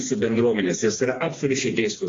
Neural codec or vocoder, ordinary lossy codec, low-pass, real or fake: codec, 16 kHz, 2 kbps, FreqCodec, smaller model; AAC, 32 kbps; 7.2 kHz; fake